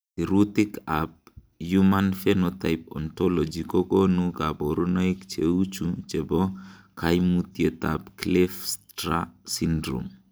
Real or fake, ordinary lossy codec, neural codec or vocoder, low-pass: real; none; none; none